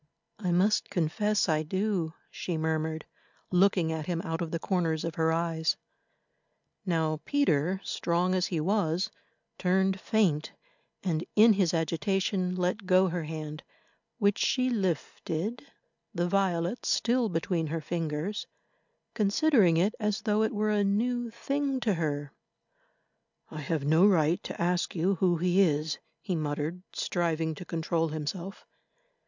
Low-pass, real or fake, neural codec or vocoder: 7.2 kHz; real; none